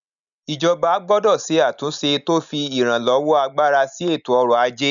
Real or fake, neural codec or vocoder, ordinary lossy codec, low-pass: real; none; none; 7.2 kHz